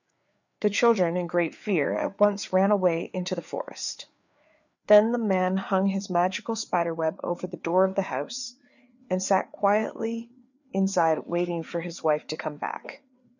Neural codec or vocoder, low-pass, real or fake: codec, 16 kHz, 4 kbps, FreqCodec, larger model; 7.2 kHz; fake